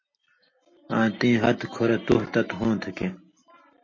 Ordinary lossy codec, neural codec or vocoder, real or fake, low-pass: MP3, 32 kbps; none; real; 7.2 kHz